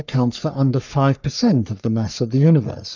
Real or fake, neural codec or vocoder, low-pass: fake; codec, 44.1 kHz, 3.4 kbps, Pupu-Codec; 7.2 kHz